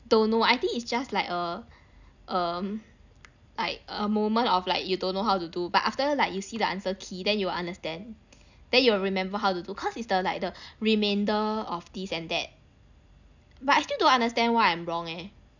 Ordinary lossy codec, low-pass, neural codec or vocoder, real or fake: none; 7.2 kHz; none; real